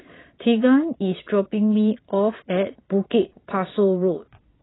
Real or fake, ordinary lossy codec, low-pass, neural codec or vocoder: fake; AAC, 16 kbps; 7.2 kHz; vocoder, 22.05 kHz, 80 mel bands, Vocos